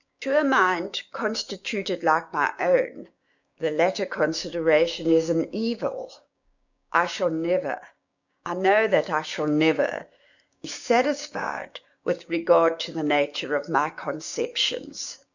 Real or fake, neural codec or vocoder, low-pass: fake; codec, 16 kHz, 6 kbps, DAC; 7.2 kHz